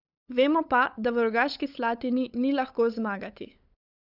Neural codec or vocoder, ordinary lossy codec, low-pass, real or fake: codec, 16 kHz, 8 kbps, FunCodec, trained on LibriTTS, 25 frames a second; none; 5.4 kHz; fake